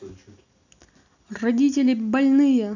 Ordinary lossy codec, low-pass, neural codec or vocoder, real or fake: none; 7.2 kHz; none; real